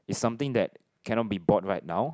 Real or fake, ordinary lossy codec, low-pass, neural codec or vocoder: real; none; none; none